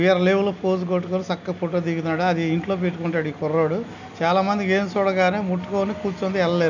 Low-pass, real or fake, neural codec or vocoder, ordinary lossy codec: 7.2 kHz; real; none; none